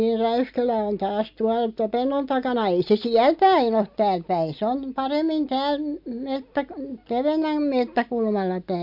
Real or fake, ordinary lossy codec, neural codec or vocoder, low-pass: real; MP3, 48 kbps; none; 5.4 kHz